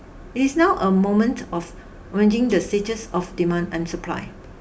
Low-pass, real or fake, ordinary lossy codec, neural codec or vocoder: none; real; none; none